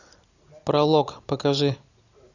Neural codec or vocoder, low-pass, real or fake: none; 7.2 kHz; real